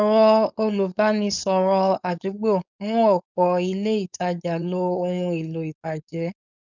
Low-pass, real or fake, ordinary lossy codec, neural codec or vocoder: 7.2 kHz; fake; none; codec, 16 kHz, 4.8 kbps, FACodec